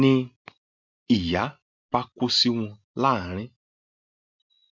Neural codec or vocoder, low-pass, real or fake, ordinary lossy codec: none; 7.2 kHz; real; MP3, 64 kbps